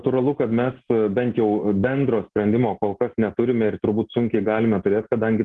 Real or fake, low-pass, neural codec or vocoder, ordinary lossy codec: real; 10.8 kHz; none; Opus, 16 kbps